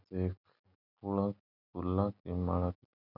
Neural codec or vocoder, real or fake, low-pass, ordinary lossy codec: none; real; 5.4 kHz; none